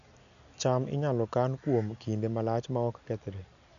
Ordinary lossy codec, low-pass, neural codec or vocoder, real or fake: none; 7.2 kHz; none; real